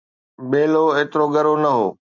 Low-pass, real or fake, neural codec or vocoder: 7.2 kHz; real; none